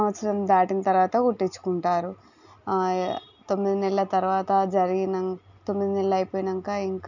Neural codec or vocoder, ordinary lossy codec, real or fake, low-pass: none; none; real; 7.2 kHz